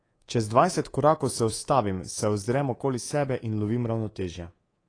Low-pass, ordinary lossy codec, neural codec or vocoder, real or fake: 9.9 kHz; AAC, 32 kbps; codec, 24 kHz, 3.1 kbps, DualCodec; fake